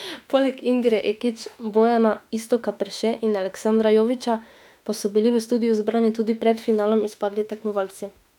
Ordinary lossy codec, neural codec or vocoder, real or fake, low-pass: none; autoencoder, 48 kHz, 32 numbers a frame, DAC-VAE, trained on Japanese speech; fake; 19.8 kHz